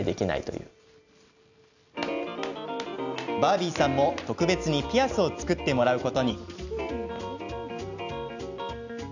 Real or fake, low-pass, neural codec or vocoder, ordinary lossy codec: real; 7.2 kHz; none; none